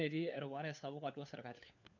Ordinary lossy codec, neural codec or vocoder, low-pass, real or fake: none; codec, 16 kHz, 4 kbps, FunCodec, trained on LibriTTS, 50 frames a second; 7.2 kHz; fake